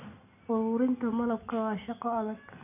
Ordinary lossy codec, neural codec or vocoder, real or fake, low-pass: none; none; real; 3.6 kHz